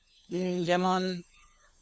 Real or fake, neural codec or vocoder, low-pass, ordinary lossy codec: fake; codec, 16 kHz, 2 kbps, FunCodec, trained on LibriTTS, 25 frames a second; none; none